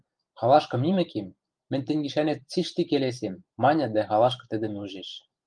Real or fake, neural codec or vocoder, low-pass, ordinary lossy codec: fake; vocoder, 44.1 kHz, 128 mel bands every 512 samples, BigVGAN v2; 9.9 kHz; Opus, 32 kbps